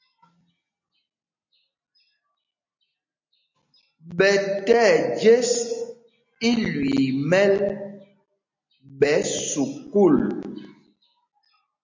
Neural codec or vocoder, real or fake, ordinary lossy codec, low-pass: none; real; MP3, 48 kbps; 7.2 kHz